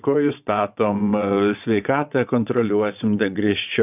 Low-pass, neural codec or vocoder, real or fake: 3.6 kHz; vocoder, 22.05 kHz, 80 mel bands, WaveNeXt; fake